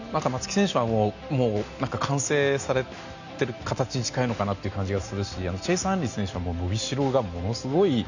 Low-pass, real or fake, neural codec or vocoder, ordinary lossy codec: 7.2 kHz; real; none; none